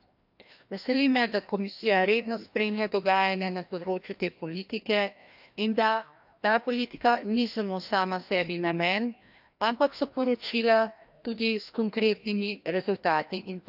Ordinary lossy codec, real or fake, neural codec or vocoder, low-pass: AAC, 48 kbps; fake; codec, 16 kHz, 1 kbps, FreqCodec, larger model; 5.4 kHz